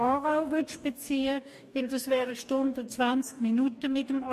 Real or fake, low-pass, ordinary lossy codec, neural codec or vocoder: fake; 14.4 kHz; MP3, 64 kbps; codec, 44.1 kHz, 2.6 kbps, DAC